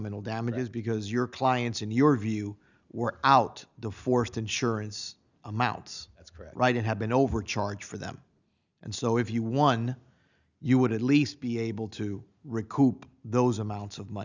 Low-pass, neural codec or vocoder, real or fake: 7.2 kHz; none; real